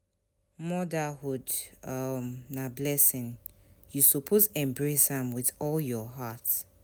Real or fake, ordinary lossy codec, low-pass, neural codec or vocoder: real; none; none; none